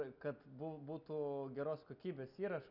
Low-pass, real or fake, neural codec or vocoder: 5.4 kHz; real; none